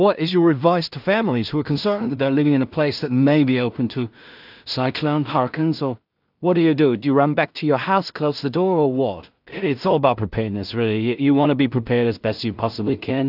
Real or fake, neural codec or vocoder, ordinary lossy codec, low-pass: fake; codec, 16 kHz in and 24 kHz out, 0.4 kbps, LongCat-Audio-Codec, two codebook decoder; AAC, 48 kbps; 5.4 kHz